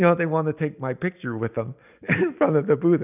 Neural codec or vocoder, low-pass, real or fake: none; 3.6 kHz; real